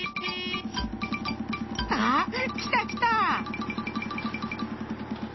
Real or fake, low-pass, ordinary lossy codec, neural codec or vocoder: real; 7.2 kHz; MP3, 24 kbps; none